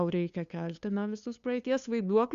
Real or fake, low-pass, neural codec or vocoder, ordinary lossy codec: fake; 7.2 kHz; codec, 16 kHz, 2 kbps, FunCodec, trained on LibriTTS, 25 frames a second; Opus, 64 kbps